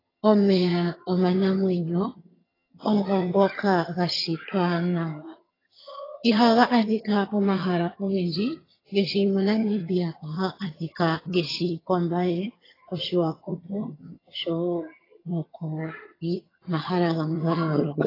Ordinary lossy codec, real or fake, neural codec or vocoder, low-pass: AAC, 24 kbps; fake; vocoder, 22.05 kHz, 80 mel bands, HiFi-GAN; 5.4 kHz